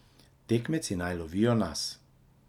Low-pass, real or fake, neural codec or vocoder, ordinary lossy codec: 19.8 kHz; real; none; none